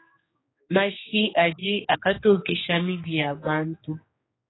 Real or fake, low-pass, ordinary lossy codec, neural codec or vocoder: fake; 7.2 kHz; AAC, 16 kbps; codec, 16 kHz, 2 kbps, X-Codec, HuBERT features, trained on general audio